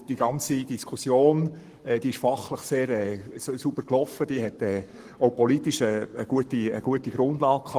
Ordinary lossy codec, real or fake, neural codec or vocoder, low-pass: Opus, 24 kbps; fake; codec, 44.1 kHz, 7.8 kbps, Pupu-Codec; 14.4 kHz